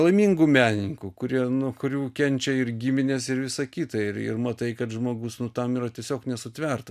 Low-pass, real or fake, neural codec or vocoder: 14.4 kHz; real; none